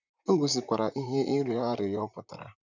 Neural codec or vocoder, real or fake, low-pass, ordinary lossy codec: vocoder, 44.1 kHz, 128 mel bands, Pupu-Vocoder; fake; 7.2 kHz; none